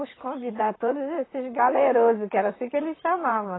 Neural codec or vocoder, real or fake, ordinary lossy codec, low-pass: vocoder, 44.1 kHz, 128 mel bands, Pupu-Vocoder; fake; AAC, 16 kbps; 7.2 kHz